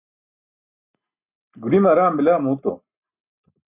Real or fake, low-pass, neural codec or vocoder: real; 3.6 kHz; none